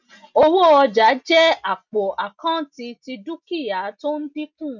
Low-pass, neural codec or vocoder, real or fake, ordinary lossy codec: 7.2 kHz; none; real; none